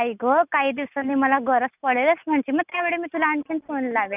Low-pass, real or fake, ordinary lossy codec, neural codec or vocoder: 3.6 kHz; real; none; none